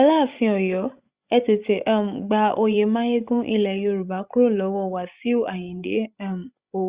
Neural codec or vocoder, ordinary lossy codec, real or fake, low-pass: codec, 16 kHz, 16 kbps, FreqCodec, larger model; Opus, 64 kbps; fake; 3.6 kHz